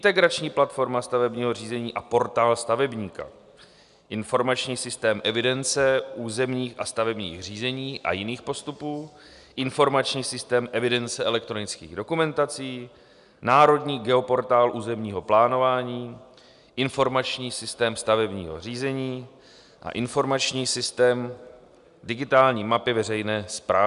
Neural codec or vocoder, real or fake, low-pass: none; real; 10.8 kHz